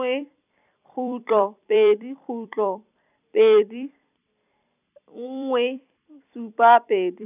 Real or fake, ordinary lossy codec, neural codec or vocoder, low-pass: fake; none; vocoder, 44.1 kHz, 128 mel bands every 256 samples, BigVGAN v2; 3.6 kHz